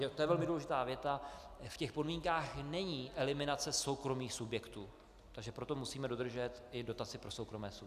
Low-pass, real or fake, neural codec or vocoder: 14.4 kHz; real; none